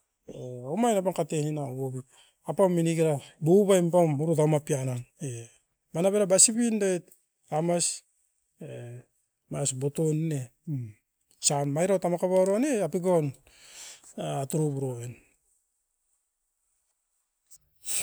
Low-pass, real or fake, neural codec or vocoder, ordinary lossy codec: none; real; none; none